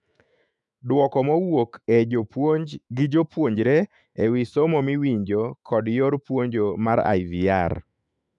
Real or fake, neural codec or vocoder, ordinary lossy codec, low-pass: fake; autoencoder, 48 kHz, 128 numbers a frame, DAC-VAE, trained on Japanese speech; none; 10.8 kHz